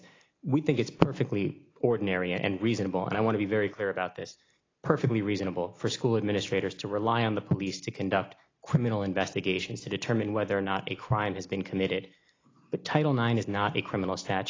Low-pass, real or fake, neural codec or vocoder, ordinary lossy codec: 7.2 kHz; real; none; AAC, 32 kbps